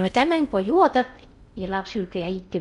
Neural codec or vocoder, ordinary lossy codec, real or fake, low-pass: codec, 16 kHz in and 24 kHz out, 0.6 kbps, FocalCodec, streaming, 4096 codes; none; fake; 10.8 kHz